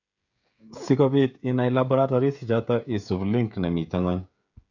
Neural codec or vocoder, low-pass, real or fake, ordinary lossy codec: codec, 16 kHz, 16 kbps, FreqCodec, smaller model; 7.2 kHz; fake; none